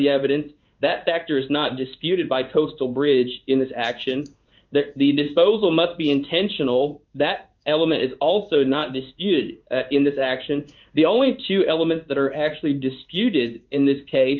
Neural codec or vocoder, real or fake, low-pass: codec, 16 kHz in and 24 kHz out, 1 kbps, XY-Tokenizer; fake; 7.2 kHz